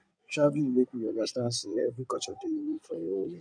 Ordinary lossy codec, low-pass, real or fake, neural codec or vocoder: none; 9.9 kHz; fake; codec, 16 kHz in and 24 kHz out, 2.2 kbps, FireRedTTS-2 codec